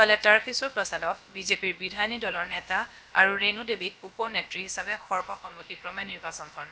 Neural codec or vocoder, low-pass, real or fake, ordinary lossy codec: codec, 16 kHz, about 1 kbps, DyCAST, with the encoder's durations; none; fake; none